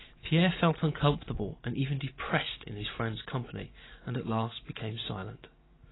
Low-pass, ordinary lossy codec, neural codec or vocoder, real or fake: 7.2 kHz; AAC, 16 kbps; none; real